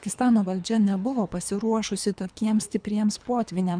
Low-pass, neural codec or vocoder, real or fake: 9.9 kHz; codec, 24 kHz, 3 kbps, HILCodec; fake